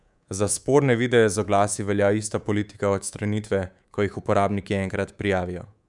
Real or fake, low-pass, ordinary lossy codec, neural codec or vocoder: fake; 10.8 kHz; none; codec, 24 kHz, 3.1 kbps, DualCodec